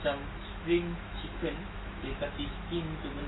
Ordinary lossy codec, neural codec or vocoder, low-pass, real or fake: AAC, 16 kbps; autoencoder, 48 kHz, 128 numbers a frame, DAC-VAE, trained on Japanese speech; 7.2 kHz; fake